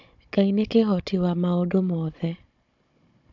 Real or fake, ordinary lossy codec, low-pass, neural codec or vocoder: fake; AAC, 48 kbps; 7.2 kHz; codec, 16 kHz, 16 kbps, FunCodec, trained on Chinese and English, 50 frames a second